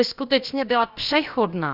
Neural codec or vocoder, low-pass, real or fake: codec, 16 kHz, about 1 kbps, DyCAST, with the encoder's durations; 5.4 kHz; fake